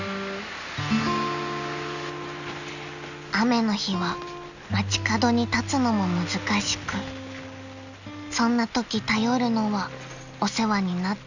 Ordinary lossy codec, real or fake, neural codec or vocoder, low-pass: none; real; none; 7.2 kHz